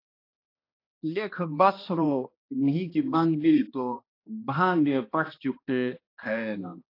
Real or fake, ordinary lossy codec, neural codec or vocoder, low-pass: fake; AAC, 32 kbps; codec, 16 kHz, 1 kbps, X-Codec, HuBERT features, trained on balanced general audio; 5.4 kHz